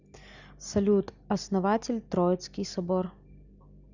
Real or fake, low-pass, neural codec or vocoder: real; 7.2 kHz; none